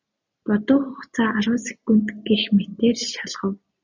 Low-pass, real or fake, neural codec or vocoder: 7.2 kHz; real; none